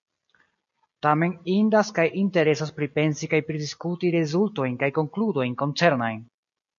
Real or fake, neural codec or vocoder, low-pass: real; none; 7.2 kHz